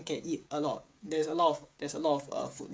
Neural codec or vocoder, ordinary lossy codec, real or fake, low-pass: codec, 16 kHz, 8 kbps, FreqCodec, smaller model; none; fake; none